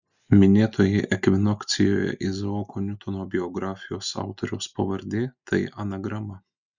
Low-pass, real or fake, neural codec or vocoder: 7.2 kHz; real; none